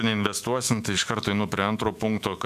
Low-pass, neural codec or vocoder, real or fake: 14.4 kHz; autoencoder, 48 kHz, 128 numbers a frame, DAC-VAE, trained on Japanese speech; fake